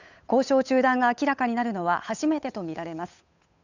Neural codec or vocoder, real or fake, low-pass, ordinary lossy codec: codec, 16 kHz, 8 kbps, FunCodec, trained on Chinese and English, 25 frames a second; fake; 7.2 kHz; none